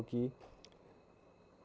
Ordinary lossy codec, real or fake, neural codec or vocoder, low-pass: none; real; none; none